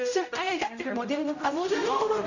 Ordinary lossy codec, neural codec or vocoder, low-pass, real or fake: none; codec, 16 kHz, 0.5 kbps, X-Codec, HuBERT features, trained on general audio; 7.2 kHz; fake